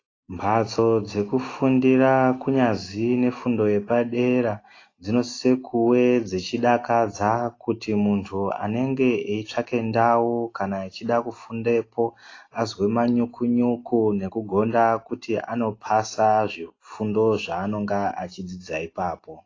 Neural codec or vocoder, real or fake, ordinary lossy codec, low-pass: none; real; AAC, 32 kbps; 7.2 kHz